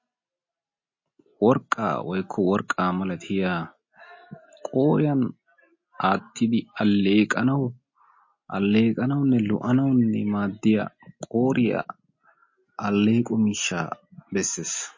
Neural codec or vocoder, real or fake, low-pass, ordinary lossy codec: none; real; 7.2 kHz; MP3, 32 kbps